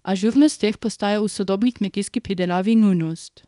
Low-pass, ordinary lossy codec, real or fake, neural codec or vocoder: 10.8 kHz; none; fake; codec, 24 kHz, 0.9 kbps, WavTokenizer, small release